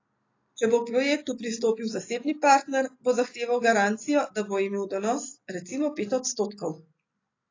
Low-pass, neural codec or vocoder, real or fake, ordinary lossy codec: 7.2 kHz; none; real; AAC, 32 kbps